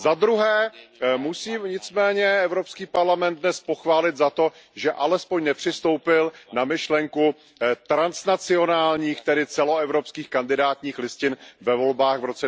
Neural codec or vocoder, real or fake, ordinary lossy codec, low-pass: none; real; none; none